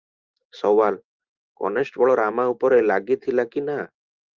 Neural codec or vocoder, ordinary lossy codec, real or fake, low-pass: none; Opus, 32 kbps; real; 7.2 kHz